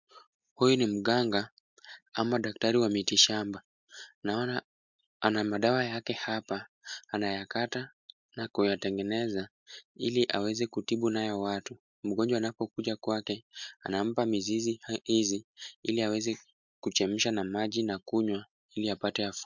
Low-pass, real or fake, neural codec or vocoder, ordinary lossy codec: 7.2 kHz; real; none; MP3, 64 kbps